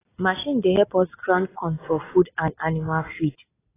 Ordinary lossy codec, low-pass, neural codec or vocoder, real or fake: AAC, 16 kbps; 3.6 kHz; none; real